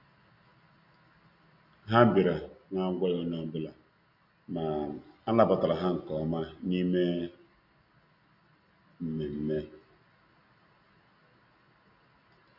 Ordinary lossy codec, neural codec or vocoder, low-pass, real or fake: none; none; 5.4 kHz; real